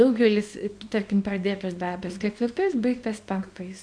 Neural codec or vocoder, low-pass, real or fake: codec, 24 kHz, 0.9 kbps, WavTokenizer, small release; 9.9 kHz; fake